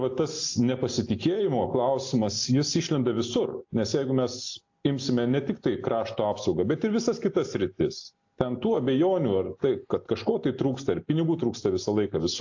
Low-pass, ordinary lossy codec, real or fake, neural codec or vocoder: 7.2 kHz; AAC, 48 kbps; real; none